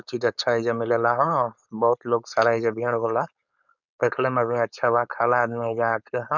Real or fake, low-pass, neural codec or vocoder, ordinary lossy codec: fake; 7.2 kHz; codec, 16 kHz, 4.8 kbps, FACodec; none